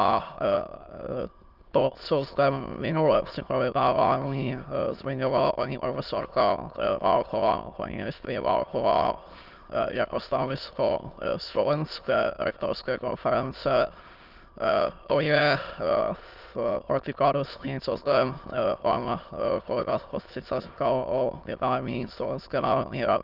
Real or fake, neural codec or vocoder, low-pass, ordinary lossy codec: fake; autoencoder, 22.05 kHz, a latent of 192 numbers a frame, VITS, trained on many speakers; 5.4 kHz; Opus, 24 kbps